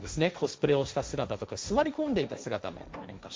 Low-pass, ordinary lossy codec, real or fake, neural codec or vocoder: none; none; fake; codec, 16 kHz, 1.1 kbps, Voila-Tokenizer